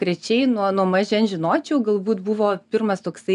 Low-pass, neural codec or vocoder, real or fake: 10.8 kHz; none; real